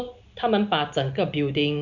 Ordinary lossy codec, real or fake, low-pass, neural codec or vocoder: none; real; 7.2 kHz; none